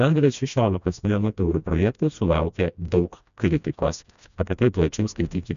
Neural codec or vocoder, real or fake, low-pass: codec, 16 kHz, 1 kbps, FreqCodec, smaller model; fake; 7.2 kHz